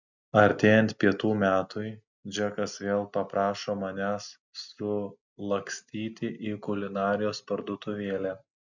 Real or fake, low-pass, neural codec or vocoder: real; 7.2 kHz; none